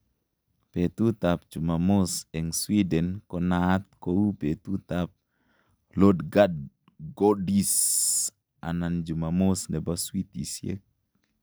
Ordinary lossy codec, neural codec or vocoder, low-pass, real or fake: none; none; none; real